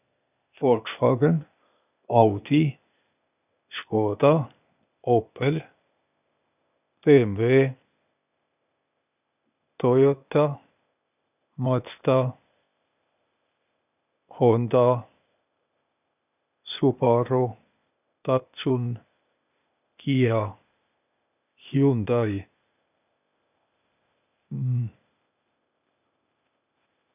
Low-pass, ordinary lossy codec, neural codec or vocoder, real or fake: 3.6 kHz; none; codec, 16 kHz, 0.8 kbps, ZipCodec; fake